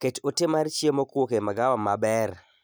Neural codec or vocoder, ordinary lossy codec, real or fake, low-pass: none; none; real; none